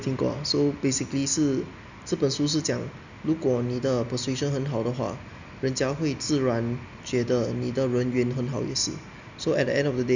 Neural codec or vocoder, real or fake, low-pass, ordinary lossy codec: none; real; 7.2 kHz; none